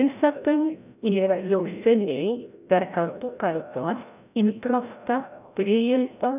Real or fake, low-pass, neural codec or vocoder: fake; 3.6 kHz; codec, 16 kHz, 0.5 kbps, FreqCodec, larger model